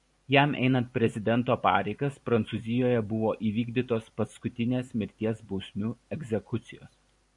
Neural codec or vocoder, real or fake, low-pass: vocoder, 44.1 kHz, 128 mel bands every 256 samples, BigVGAN v2; fake; 10.8 kHz